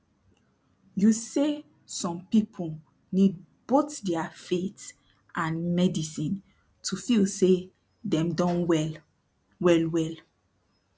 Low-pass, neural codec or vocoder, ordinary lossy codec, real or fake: none; none; none; real